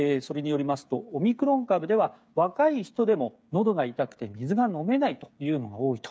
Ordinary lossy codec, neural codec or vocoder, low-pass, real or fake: none; codec, 16 kHz, 8 kbps, FreqCodec, smaller model; none; fake